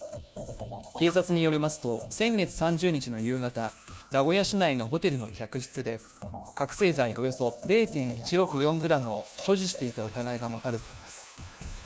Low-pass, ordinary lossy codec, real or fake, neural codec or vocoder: none; none; fake; codec, 16 kHz, 1 kbps, FunCodec, trained on LibriTTS, 50 frames a second